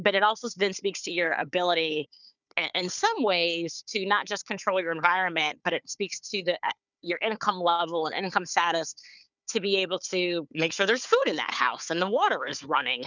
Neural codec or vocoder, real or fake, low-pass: codec, 16 kHz, 4 kbps, FunCodec, trained on Chinese and English, 50 frames a second; fake; 7.2 kHz